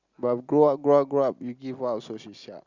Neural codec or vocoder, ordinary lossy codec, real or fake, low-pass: none; Opus, 64 kbps; real; 7.2 kHz